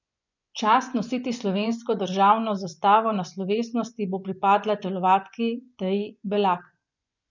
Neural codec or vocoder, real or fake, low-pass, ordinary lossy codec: vocoder, 24 kHz, 100 mel bands, Vocos; fake; 7.2 kHz; none